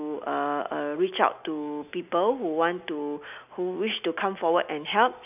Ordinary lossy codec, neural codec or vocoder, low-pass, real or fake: none; none; 3.6 kHz; real